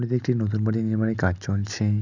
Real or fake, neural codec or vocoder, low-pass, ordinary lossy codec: real; none; 7.2 kHz; none